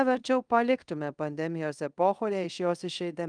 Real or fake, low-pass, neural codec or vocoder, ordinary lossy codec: fake; 9.9 kHz; codec, 24 kHz, 0.5 kbps, DualCodec; Opus, 32 kbps